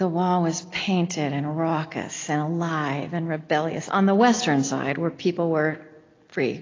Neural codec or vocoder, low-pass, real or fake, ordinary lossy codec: none; 7.2 kHz; real; AAC, 32 kbps